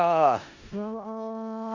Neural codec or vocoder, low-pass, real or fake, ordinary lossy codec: codec, 16 kHz in and 24 kHz out, 0.4 kbps, LongCat-Audio-Codec, four codebook decoder; 7.2 kHz; fake; Opus, 64 kbps